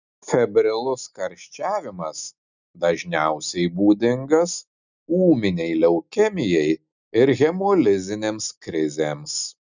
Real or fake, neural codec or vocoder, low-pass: real; none; 7.2 kHz